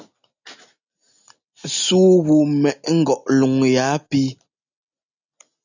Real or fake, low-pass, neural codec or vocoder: real; 7.2 kHz; none